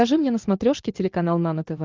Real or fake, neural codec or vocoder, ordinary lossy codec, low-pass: real; none; Opus, 24 kbps; 7.2 kHz